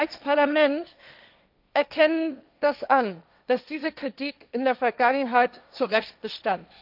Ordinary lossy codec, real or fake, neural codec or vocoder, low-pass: none; fake; codec, 16 kHz, 1.1 kbps, Voila-Tokenizer; 5.4 kHz